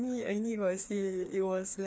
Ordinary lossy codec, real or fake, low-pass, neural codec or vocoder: none; fake; none; codec, 16 kHz, 2 kbps, FreqCodec, larger model